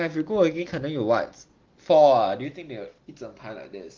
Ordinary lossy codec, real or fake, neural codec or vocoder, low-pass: Opus, 16 kbps; real; none; 7.2 kHz